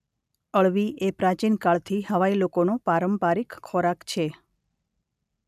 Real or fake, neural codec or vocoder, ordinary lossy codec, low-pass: real; none; none; 14.4 kHz